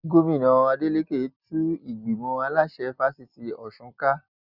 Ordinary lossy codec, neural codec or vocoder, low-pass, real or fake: none; none; 5.4 kHz; real